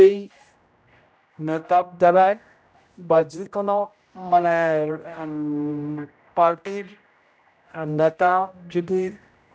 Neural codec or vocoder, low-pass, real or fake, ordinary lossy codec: codec, 16 kHz, 0.5 kbps, X-Codec, HuBERT features, trained on general audio; none; fake; none